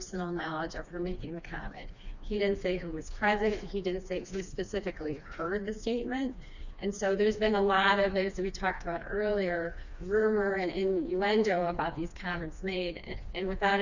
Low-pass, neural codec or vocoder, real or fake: 7.2 kHz; codec, 16 kHz, 2 kbps, FreqCodec, smaller model; fake